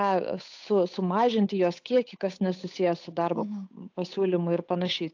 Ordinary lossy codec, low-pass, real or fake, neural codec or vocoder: AAC, 48 kbps; 7.2 kHz; fake; codec, 16 kHz, 8 kbps, FunCodec, trained on Chinese and English, 25 frames a second